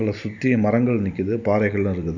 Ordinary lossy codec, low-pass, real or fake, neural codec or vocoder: none; 7.2 kHz; real; none